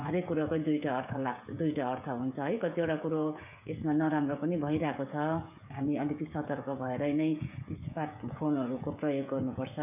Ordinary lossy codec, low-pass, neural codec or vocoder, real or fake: none; 3.6 kHz; codec, 16 kHz, 16 kbps, FreqCodec, smaller model; fake